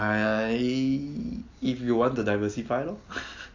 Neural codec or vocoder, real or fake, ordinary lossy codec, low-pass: none; real; AAC, 48 kbps; 7.2 kHz